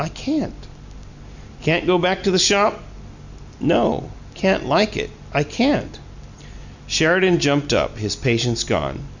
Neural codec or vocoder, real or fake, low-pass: none; real; 7.2 kHz